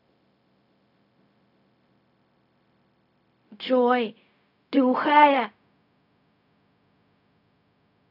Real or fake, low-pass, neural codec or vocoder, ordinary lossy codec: fake; 5.4 kHz; codec, 16 kHz, 0.4 kbps, LongCat-Audio-Codec; none